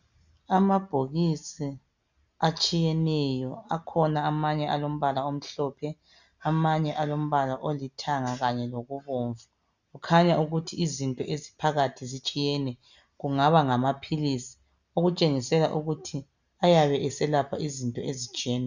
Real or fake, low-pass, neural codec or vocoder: real; 7.2 kHz; none